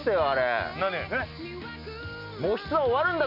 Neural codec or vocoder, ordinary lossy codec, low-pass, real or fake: none; none; 5.4 kHz; real